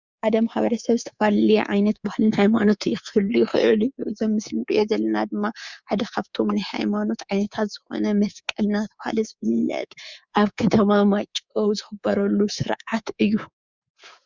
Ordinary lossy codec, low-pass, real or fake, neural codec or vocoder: Opus, 64 kbps; 7.2 kHz; fake; codec, 24 kHz, 3.1 kbps, DualCodec